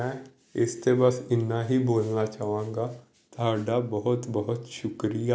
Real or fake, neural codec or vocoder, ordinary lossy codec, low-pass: real; none; none; none